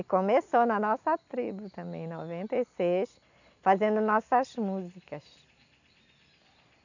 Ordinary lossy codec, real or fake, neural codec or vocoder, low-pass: none; real; none; 7.2 kHz